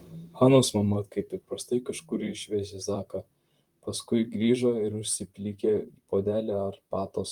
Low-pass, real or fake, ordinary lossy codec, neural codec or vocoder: 19.8 kHz; fake; Opus, 32 kbps; vocoder, 44.1 kHz, 128 mel bands, Pupu-Vocoder